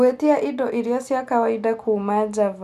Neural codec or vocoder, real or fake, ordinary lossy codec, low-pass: none; real; none; 14.4 kHz